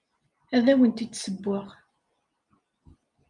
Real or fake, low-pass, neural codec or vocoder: real; 10.8 kHz; none